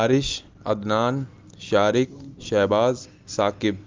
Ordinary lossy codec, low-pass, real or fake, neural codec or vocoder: Opus, 32 kbps; 7.2 kHz; real; none